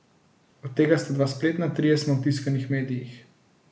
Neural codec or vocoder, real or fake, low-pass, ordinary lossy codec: none; real; none; none